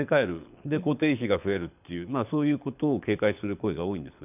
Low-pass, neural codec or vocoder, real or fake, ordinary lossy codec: 3.6 kHz; codec, 24 kHz, 6 kbps, HILCodec; fake; none